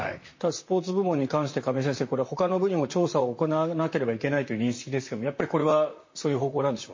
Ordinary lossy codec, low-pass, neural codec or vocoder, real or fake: MP3, 32 kbps; 7.2 kHz; vocoder, 44.1 kHz, 128 mel bands, Pupu-Vocoder; fake